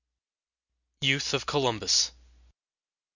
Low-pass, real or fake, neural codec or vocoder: 7.2 kHz; real; none